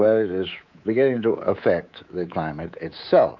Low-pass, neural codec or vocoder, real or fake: 7.2 kHz; codec, 24 kHz, 3.1 kbps, DualCodec; fake